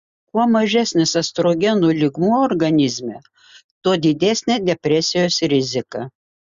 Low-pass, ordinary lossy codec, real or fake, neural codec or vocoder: 7.2 kHz; Opus, 64 kbps; real; none